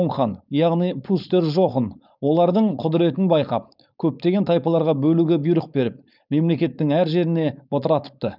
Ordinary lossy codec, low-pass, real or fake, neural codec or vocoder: none; 5.4 kHz; fake; codec, 16 kHz, 4.8 kbps, FACodec